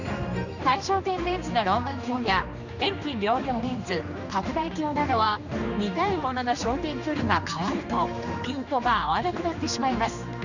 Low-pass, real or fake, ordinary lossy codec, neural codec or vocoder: 7.2 kHz; fake; none; codec, 16 kHz, 2 kbps, X-Codec, HuBERT features, trained on general audio